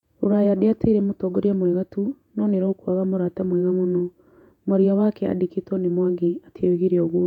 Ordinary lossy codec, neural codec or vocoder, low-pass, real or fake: none; vocoder, 48 kHz, 128 mel bands, Vocos; 19.8 kHz; fake